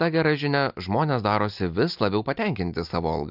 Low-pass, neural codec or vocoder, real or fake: 5.4 kHz; none; real